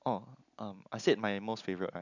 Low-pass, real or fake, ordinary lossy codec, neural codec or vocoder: 7.2 kHz; real; none; none